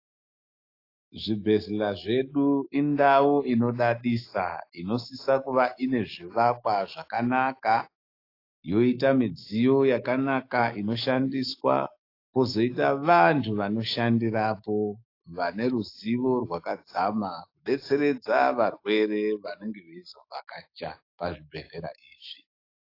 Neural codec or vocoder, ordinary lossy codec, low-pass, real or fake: autoencoder, 48 kHz, 128 numbers a frame, DAC-VAE, trained on Japanese speech; AAC, 32 kbps; 5.4 kHz; fake